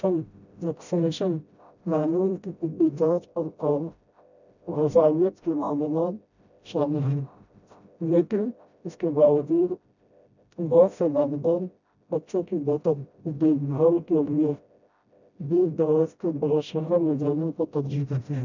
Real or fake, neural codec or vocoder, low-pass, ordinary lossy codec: fake; codec, 16 kHz, 0.5 kbps, FreqCodec, smaller model; 7.2 kHz; none